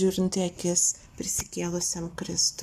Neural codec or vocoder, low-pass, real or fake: none; 14.4 kHz; real